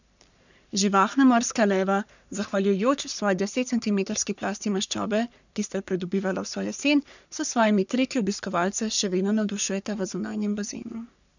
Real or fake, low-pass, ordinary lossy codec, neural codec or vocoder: fake; 7.2 kHz; none; codec, 44.1 kHz, 3.4 kbps, Pupu-Codec